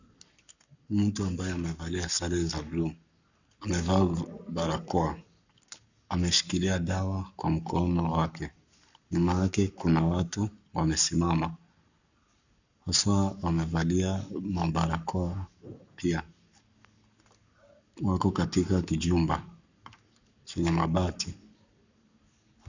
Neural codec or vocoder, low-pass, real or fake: codec, 44.1 kHz, 7.8 kbps, Pupu-Codec; 7.2 kHz; fake